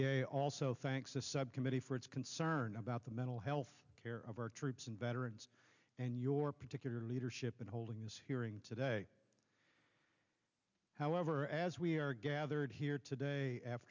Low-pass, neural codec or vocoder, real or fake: 7.2 kHz; none; real